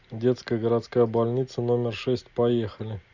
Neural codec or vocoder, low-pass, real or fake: none; 7.2 kHz; real